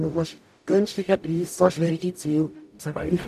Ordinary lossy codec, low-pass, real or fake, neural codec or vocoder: none; 14.4 kHz; fake; codec, 44.1 kHz, 0.9 kbps, DAC